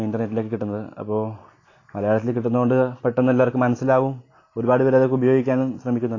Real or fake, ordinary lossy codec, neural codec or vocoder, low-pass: real; AAC, 32 kbps; none; 7.2 kHz